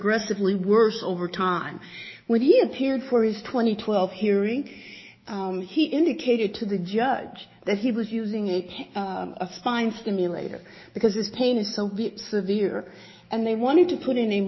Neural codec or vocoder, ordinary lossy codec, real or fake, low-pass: autoencoder, 48 kHz, 128 numbers a frame, DAC-VAE, trained on Japanese speech; MP3, 24 kbps; fake; 7.2 kHz